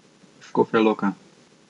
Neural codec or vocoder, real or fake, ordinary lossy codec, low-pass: none; real; none; 10.8 kHz